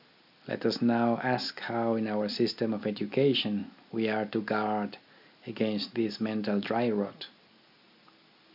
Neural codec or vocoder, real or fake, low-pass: none; real; 5.4 kHz